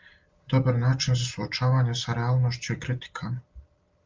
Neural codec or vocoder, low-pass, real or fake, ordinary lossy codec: none; 7.2 kHz; real; Opus, 32 kbps